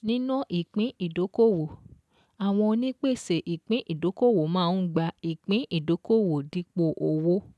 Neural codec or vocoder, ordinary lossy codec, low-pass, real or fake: none; none; none; real